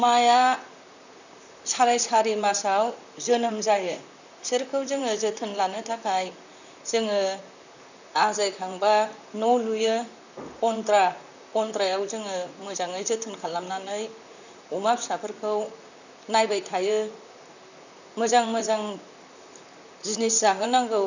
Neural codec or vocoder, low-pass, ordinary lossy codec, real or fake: vocoder, 44.1 kHz, 128 mel bands, Pupu-Vocoder; 7.2 kHz; none; fake